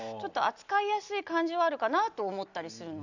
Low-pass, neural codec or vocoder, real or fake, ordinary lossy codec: 7.2 kHz; none; real; none